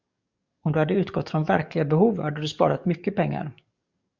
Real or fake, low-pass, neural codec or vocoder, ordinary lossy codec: fake; 7.2 kHz; codec, 44.1 kHz, 7.8 kbps, DAC; Opus, 64 kbps